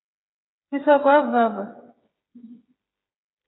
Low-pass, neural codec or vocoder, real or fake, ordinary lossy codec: 7.2 kHz; codec, 16 kHz, 8 kbps, FreqCodec, smaller model; fake; AAC, 16 kbps